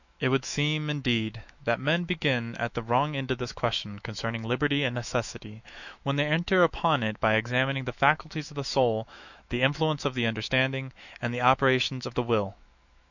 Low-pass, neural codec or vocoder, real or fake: 7.2 kHz; autoencoder, 48 kHz, 128 numbers a frame, DAC-VAE, trained on Japanese speech; fake